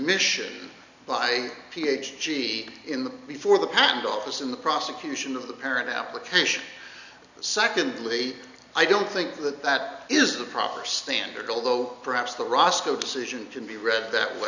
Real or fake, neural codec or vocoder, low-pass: real; none; 7.2 kHz